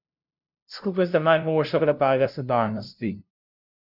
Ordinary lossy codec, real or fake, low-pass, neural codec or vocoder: none; fake; 5.4 kHz; codec, 16 kHz, 0.5 kbps, FunCodec, trained on LibriTTS, 25 frames a second